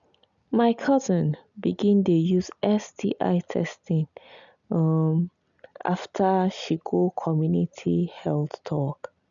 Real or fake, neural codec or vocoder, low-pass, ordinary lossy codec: real; none; 7.2 kHz; none